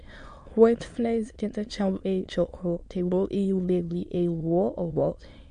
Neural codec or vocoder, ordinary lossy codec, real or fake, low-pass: autoencoder, 22.05 kHz, a latent of 192 numbers a frame, VITS, trained on many speakers; MP3, 48 kbps; fake; 9.9 kHz